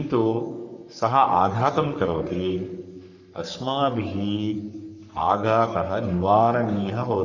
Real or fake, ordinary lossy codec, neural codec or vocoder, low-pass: fake; none; codec, 44.1 kHz, 3.4 kbps, Pupu-Codec; 7.2 kHz